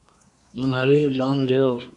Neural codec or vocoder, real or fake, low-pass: codec, 24 kHz, 1 kbps, SNAC; fake; 10.8 kHz